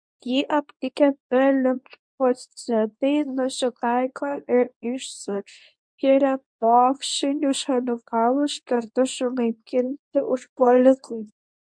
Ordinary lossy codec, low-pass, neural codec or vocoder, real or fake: MP3, 64 kbps; 9.9 kHz; codec, 24 kHz, 0.9 kbps, WavTokenizer, medium speech release version 2; fake